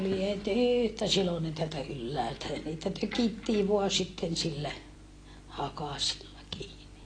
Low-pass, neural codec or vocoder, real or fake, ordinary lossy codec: 9.9 kHz; none; real; AAC, 32 kbps